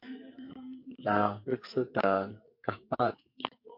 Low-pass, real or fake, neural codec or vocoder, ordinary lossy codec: 5.4 kHz; fake; codec, 44.1 kHz, 2.6 kbps, SNAC; MP3, 48 kbps